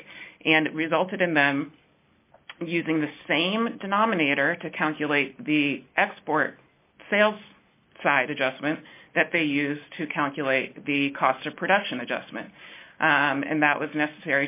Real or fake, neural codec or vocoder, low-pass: real; none; 3.6 kHz